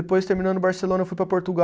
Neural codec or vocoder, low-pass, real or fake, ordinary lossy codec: none; none; real; none